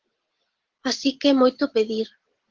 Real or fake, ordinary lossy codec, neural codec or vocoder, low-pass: real; Opus, 16 kbps; none; 7.2 kHz